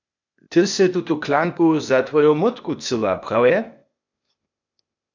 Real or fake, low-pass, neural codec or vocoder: fake; 7.2 kHz; codec, 16 kHz, 0.8 kbps, ZipCodec